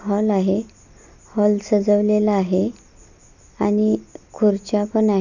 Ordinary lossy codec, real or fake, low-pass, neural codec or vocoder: none; real; 7.2 kHz; none